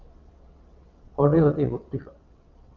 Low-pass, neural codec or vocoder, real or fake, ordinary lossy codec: 7.2 kHz; vocoder, 22.05 kHz, 80 mel bands, WaveNeXt; fake; Opus, 32 kbps